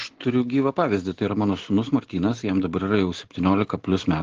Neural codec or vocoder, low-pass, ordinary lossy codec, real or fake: none; 7.2 kHz; Opus, 16 kbps; real